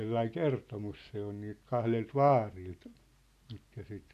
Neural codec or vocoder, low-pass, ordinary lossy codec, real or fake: none; 14.4 kHz; none; real